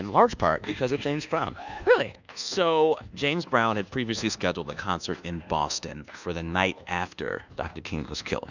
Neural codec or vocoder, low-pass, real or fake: codec, 24 kHz, 1.2 kbps, DualCodec; 7.2 kHz; fake